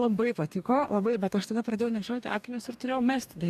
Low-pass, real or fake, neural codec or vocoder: 14.4 kHz; fake; codec, 44.1 kHz, 2.6 kbps, DAC